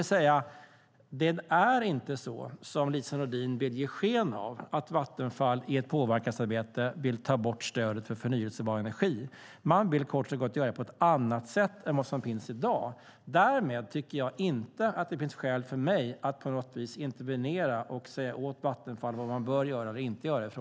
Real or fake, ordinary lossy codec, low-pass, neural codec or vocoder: real; none; none; none